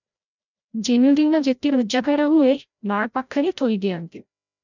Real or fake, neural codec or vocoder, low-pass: fake; codec, 16 kHz, 0.5 kbps, FreqCodec, larger model; 7.2 kHz